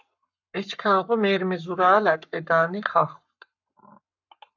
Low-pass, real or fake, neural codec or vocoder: 7.2 kHz; fake; codec, 44.1 kHz, 7.8 kbps, Pupu-Codec